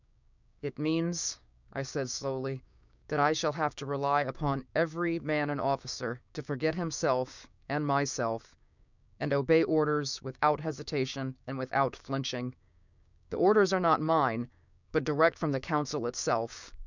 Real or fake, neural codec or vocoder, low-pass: fake; codec, 16 kHz, 6 kbps, DAC; 7.2 kHz